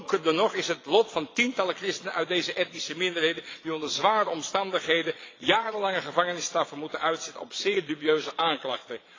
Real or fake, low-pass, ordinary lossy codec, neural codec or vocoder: fake; 7.2 kHz; AAC, 32 kbps; vocoder, 22.05 kHz, 80 mel bands, Vocos